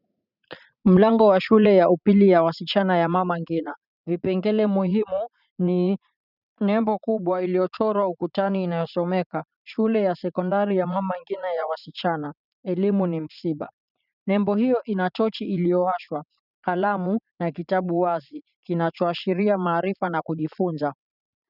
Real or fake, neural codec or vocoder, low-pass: fake; vocoder, 44.1 kHz, 80 mel bands, Vocos; 5.4 kHz